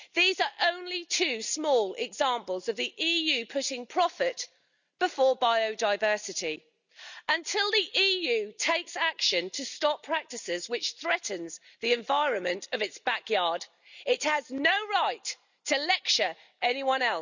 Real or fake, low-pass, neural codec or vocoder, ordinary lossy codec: real; 7.2 kHz; none; none